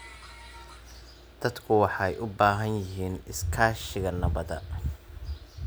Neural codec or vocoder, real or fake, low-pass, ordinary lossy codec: none; real; none; none